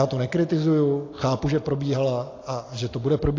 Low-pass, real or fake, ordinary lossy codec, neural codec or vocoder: 7.2 kHz; real; AAC, 32 kbps; none